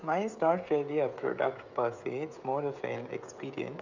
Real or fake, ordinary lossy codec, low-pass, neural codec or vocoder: fake; MP3, 64 kbps; 7.2 kHz; codec, 16 kHz, 16 kbps, FreqCodec, smaller model